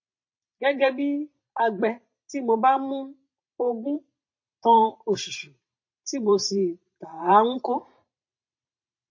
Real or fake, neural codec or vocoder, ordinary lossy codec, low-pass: fake; vocoder, 44.1 kHz, 128 mel bands every 512 samples, BigVGAN v2; MP3, 32 kbps; 7.2 kHz